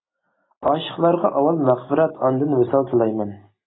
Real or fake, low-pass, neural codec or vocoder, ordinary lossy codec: real; 7.2 kHz; none; AAC, 16 kbps